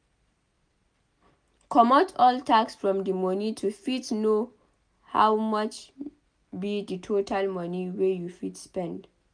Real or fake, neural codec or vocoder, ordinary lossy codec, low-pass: real; none; none; 9.9 kHz